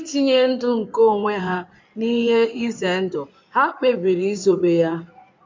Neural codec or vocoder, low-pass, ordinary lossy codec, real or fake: codec, 16 kHz in and 24 kHz out, 2.2 kbps, FireRedTTS-2 codec; 7.2 kHz; MP3, 64 kbps; fake